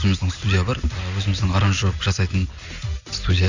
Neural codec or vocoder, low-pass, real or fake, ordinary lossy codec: none; 7.2 kHz; real; Opus, 64 kbps